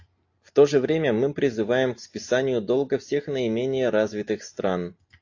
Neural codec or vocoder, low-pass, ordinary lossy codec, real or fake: none; 7.2 kHz; AAC, 48 kbps; real